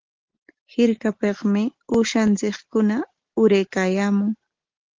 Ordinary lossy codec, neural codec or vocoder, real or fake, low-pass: Opus, 16 kbps; none; real; 7.2 kHz